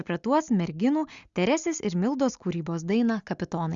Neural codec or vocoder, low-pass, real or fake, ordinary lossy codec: none; 7.2 kHz; real; Opus, 64 kbps